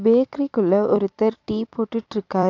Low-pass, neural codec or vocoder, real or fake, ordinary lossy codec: 7.2 kHz; vocoder, 44.1 kHz, 128 mel bands every 256 samples, BigVGAN v2; fake; AAC, 48 kbps